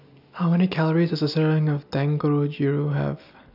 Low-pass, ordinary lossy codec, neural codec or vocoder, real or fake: 5.4 kHz; none; none; real